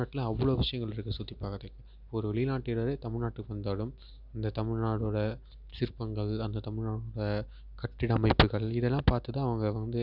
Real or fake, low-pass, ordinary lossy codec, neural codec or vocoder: real; 5.4 kHz; none; none